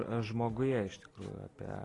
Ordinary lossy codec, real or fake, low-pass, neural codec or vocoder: AAC, 32 kbps; real; 10.8 kHz; none